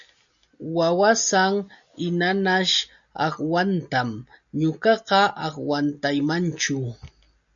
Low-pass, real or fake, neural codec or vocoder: 7.2 kHz; real; none